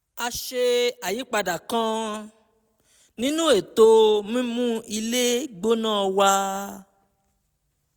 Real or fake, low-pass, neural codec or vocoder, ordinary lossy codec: real; none; none; none